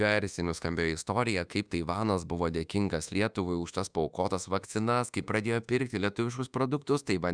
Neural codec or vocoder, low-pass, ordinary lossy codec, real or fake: codec, 24 kHz, 1.2 kbps, DualCodec; 9.9 kHz; Opus, 64 kbps; fake